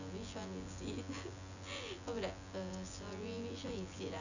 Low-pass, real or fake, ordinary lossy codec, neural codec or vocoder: 7.2 kHz; fake; none; vocoder, 24 kHz, 100 mel bands, Vocos